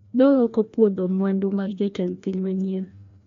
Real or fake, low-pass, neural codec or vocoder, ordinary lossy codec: fake; 7.2 kHz; codec, 16 kHz, 1 kbps, FreqCodec, larger model; MP3, 48 kbps